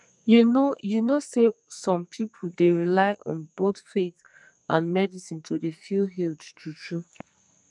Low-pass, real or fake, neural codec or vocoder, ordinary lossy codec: 10.8 kHz; fake; codec, 44.1 kHz, 2.6 kbps, SNAC; none